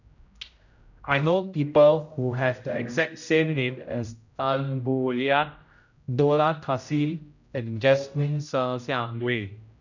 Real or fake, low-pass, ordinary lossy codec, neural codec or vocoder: fake; 7.2 kHz; none; codec, 16 kHz, 0.5 kbps, X-Codec, HuBERT features, trained on general audio